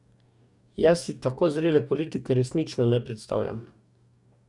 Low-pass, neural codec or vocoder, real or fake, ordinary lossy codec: 10.8 kHz; codec, 44.1 kHz, 2.6 kbps, DAC; fake; none